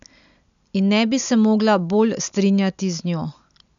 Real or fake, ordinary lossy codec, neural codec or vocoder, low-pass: real; none; none; 7.2 kHz